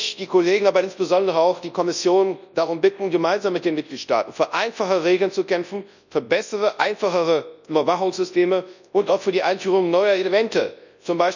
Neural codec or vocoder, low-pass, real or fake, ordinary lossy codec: codec, 24 kHz, 0.9 kbps, WavTokenizer, large speech release; 7.2 kHz; fake; none